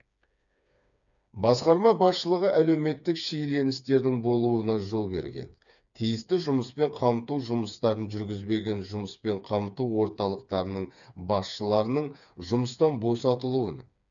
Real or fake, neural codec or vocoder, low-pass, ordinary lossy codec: fake; codec, 16 kHz, 4 kbps, FreqCodec, smaller model; 7.2 kHz; none